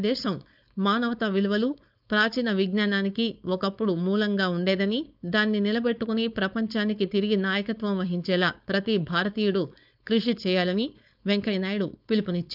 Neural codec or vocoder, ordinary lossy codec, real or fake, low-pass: codec, 16 kHz, 4.8 kbps, FACodec; none; fake; 5.4 kHz